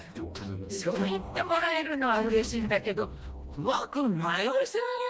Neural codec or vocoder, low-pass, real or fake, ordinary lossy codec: codec, 16 kHz, 1 kbps, FreqCodec, smaller model; none; fake; none